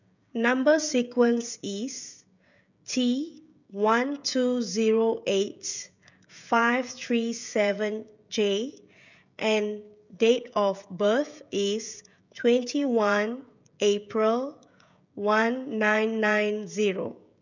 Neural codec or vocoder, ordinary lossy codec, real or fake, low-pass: codec, 16 kHz, 16 kbps, FreqCodec, smaller model; none; fake; 7.2 kHz